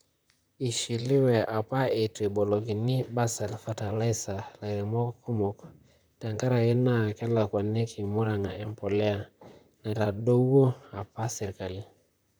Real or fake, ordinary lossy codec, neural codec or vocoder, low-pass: fake; none; vocoder, 44.1 kHz, 128 mel bands, Pupu-Vocoder; none